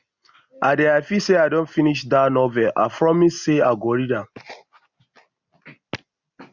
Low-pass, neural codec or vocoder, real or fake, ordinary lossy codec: 7.2 kHz; none; real; none